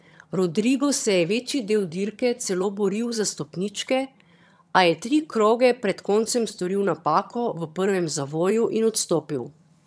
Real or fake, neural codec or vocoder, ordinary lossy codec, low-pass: fake; vocoder, 22.05 kHz, 80 mel bands, HiFi-GAN; none; none